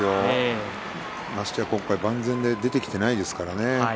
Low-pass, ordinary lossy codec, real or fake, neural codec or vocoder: none; none; real; none